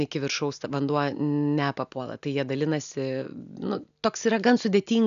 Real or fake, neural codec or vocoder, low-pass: real; none; 7.2 kHz